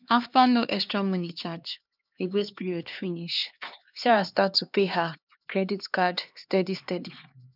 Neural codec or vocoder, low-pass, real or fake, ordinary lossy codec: codec, 16 kHz, 2 kbps, X-Codec, HuBERT features, trained on LibriSpeech; 5.4 kHz; fake; none